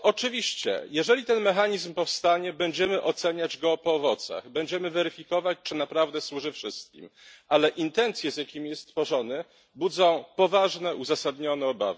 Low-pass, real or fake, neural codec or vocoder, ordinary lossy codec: none; real; none; none